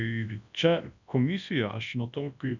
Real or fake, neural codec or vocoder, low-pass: fake; codec, 24 kHz, 0.9 kbps, WavTokenizer, large speech release; 7.2 kHz